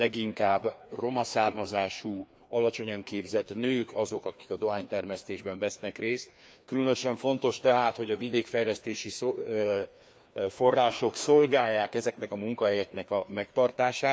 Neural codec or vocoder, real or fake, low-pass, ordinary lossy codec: codec, 16 kHz, 2 kbps, FreqCodec, larger model; fake; none; none